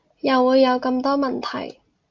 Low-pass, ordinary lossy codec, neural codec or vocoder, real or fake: 7.2 kHz; Opus, 32 kbps; none; real